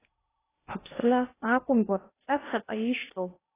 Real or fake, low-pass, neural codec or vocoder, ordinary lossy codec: fake; 3.6 kHz; codec, 16 kHz in and 24 kHz out, 0.6 kbps, FocalCodec, streaming, 2048 codes; AAC, 16 kbps